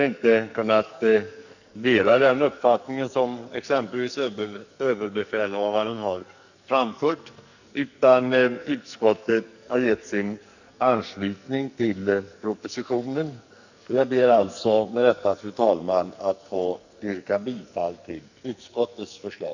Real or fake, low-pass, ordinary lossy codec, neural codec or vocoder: fake; 7.2 kHz; none; codec, 44.1 kHz, 2.6 kbps, SNAC